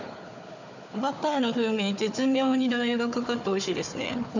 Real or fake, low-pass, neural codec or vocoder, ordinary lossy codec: fake; 7.2 kHz; codec, 16 kHz, 4 kbps, FunCodec, trained on Chinese and English, 50 frames a second; none